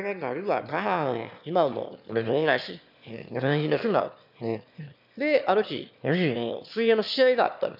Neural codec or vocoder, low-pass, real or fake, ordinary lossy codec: autoencoder, 22.05 kHz, a latent of 192 numbers a frame, VITS, trained on one speaker; 5.4 kHz; fake; none